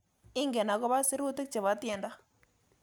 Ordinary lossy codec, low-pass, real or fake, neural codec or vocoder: none; none; real; none